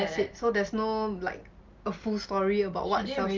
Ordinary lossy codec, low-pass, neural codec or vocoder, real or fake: Opus, 32 kbps; 7.2 kHz; none; real